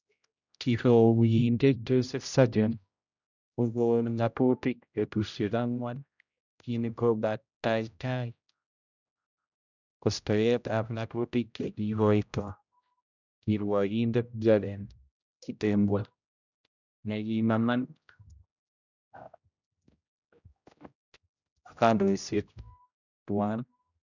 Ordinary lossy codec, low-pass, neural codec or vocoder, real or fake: none; 7.2 kHz; codec, 16 kHz, 0.5 kbps, X-Codec, HuBERT features, trained on general audio; fake